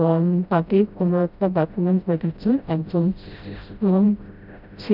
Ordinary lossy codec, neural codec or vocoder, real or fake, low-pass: none; codec, 16 kHz, 0.5 kbps, FreqCodec, smaller model; fake; 5.4 kHz